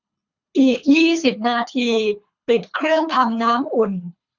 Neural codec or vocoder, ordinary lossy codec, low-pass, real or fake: codec, 24 kHz, 3 kbps, HILCodec; none; 7.2 kHz; fake